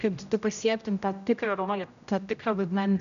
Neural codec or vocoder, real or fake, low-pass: codec, 16 kHz, 0.5 kbps, X-Codec, HuBERT features, trained on general audio; fake; 7.2 kHz